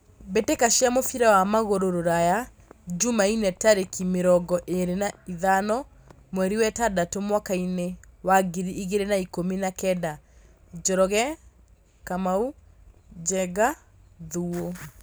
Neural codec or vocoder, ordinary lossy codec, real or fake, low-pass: none; none; real; none